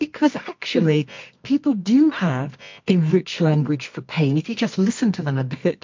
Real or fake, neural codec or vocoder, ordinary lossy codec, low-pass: fake; codec, 24 kHz, 0.9 kbps, WavTokenizer, medium music audio release; MP3, 48 kbps; 7.2 kHz